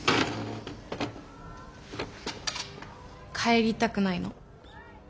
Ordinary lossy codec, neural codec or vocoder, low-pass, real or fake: none; none; none; real